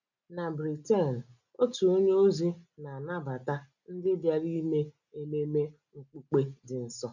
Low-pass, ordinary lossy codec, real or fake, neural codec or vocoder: 7.2 kHz; none; real; none